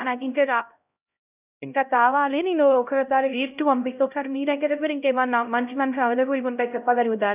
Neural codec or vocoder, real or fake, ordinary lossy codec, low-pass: codec, 16 kHz, 0.5 kbps, X-Codec, HuBERT features, trained on LibriSpeech; fake; none; 3.6 kHz